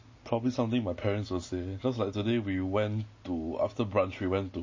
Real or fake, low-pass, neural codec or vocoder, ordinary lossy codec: real; 7.2 kHz; none; MP3, 32 kbps